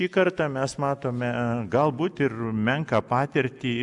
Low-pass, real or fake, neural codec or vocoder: 10.8 kHz; real; none